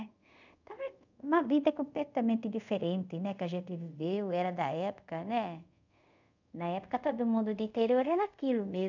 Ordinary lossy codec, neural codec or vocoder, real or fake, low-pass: none; codec, 24 kHz, 0.5 kbps, DualCodec; fake; 7.2 kHz